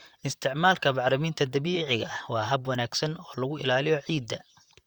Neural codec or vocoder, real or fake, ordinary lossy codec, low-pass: vocoder, 44.1 kHz, 128 mel bands, Pupu-Vocoder; fake; Opus, 64 kbps; 19.8 kHz